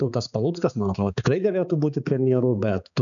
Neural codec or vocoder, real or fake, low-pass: codec, 16 kHz, 4 kbps, X-Codec, HuBERT features, trained on balanced general audio; fake; 7.2 kHz